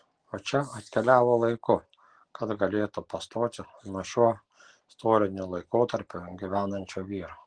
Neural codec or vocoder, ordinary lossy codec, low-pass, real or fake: none; Opus, 16 kbps; 9.9 kHz; real